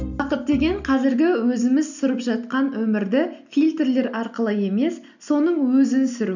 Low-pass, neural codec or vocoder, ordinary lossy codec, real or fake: 7.2 kHz; none; none; real